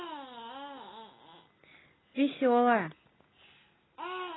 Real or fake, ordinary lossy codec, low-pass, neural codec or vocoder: real; AAC, 16 kbps; 7.2 kHz; none